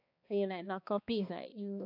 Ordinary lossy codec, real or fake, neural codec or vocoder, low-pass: AAC, 32 kbps; fake; codec, 16 kHz, 2 kbps, X-Codec, HuBERT features, trained on balanced general audio; 5.4 kHz